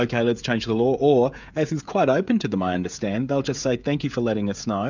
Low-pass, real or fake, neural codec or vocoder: 7.2 kHz; fake; codec, 16 kHz, 16 kbps, FreqCodec, smaller model